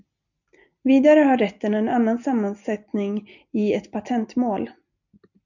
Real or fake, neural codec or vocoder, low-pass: real; none; 7.2 kHz